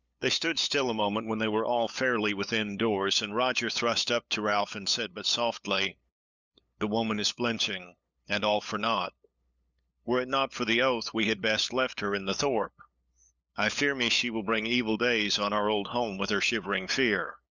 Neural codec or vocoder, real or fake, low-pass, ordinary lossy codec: codec, 16 kHz, 8 kbps, FunCodec, trained on LibriTTS, 25 frames a second; fake; 7.2 kHz; Opus, 64 kbps